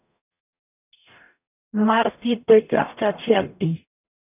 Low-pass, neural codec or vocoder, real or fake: 3.6 kHz; codec, 44.1 kHz, 0.9 kbps, DAC; fake